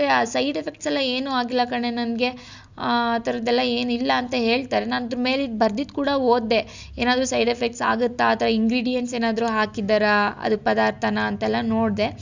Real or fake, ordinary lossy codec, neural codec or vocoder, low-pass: real; Opus, 64 kbps; none; 7.2 kHz